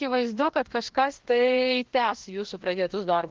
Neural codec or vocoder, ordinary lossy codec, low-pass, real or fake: codec, 16 kHz, 1 kbps, FreqCodec, larger model; Opus, 16 kbps; 7.2 kHz; fake